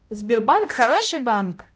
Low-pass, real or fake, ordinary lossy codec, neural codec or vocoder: none; fake; none; codec, 16 kHz, 0.5 kbps, X-Codec, HuBERT features, trained on balanced general audio